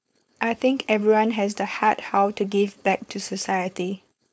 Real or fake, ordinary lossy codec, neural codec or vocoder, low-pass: fake; none; codec, 16 kHz, 4.8 kbps, FACodec; none